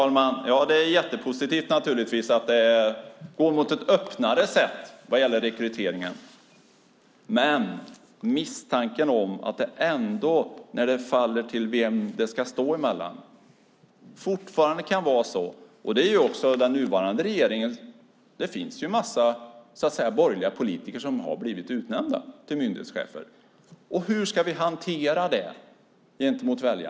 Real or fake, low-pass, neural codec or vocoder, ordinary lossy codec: real; none; none; none